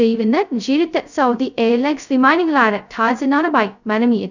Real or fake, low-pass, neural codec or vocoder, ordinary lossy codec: fake; 7.2 kHz; codec, 16 kHz, 0.2 kbps, FocalCodec; none